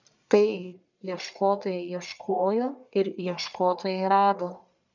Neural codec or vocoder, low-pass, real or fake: codec, 44.1 kHz, 1.7 kbps, Pupu-Codec; 7.2 kHz; fake